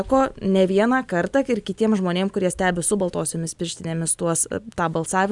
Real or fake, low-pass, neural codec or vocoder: real; 10.8 kHz; none